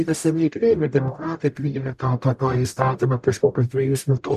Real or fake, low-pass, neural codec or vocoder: fake; 14.4 kHz; codec, 44.1 kHz, 0.9 kbps, DAC